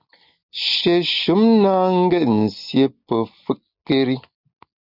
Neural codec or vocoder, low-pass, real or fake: none; 5.4 kHz; real